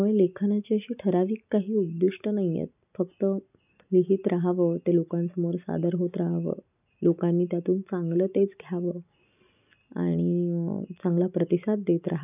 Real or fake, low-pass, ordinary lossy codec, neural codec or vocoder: real; 3.6 kHz; none; none